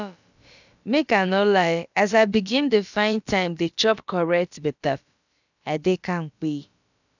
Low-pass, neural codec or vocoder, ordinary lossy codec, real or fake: 7.2 kHz; codec, 16 kHz, about 1 kbps, DyCAST, with the encoder's durations; none; fake